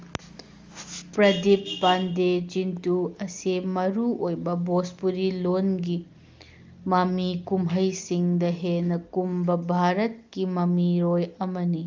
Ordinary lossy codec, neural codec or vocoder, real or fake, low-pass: Opus, 32 kbps; none; real; 7.2 kHz